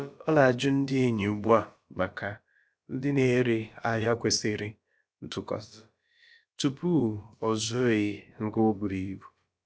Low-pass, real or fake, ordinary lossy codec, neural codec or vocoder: none; fake; none; codec, 16 kHz, about 1 kbps, DyCAST, with the encoder's durations